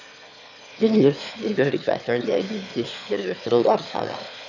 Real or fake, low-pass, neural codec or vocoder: fake; 7.2 kHz; autoencoder, 22.05 kHz, a latent of 192 numbers a frame, VITS, trained on one speaker